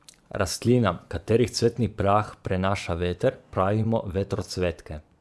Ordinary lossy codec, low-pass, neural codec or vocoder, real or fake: none; none; vocoder, 24 kHz, 100 mel bands, Vocos; fake